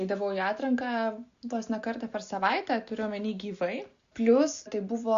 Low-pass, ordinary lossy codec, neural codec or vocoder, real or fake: 7.2 kHz; Opus, 64 kbps; none; real